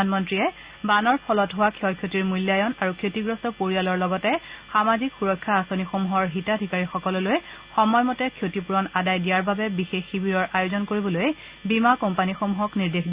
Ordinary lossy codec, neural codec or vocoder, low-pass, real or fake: Opus, 64 kbps; none; 3.6 kHz; real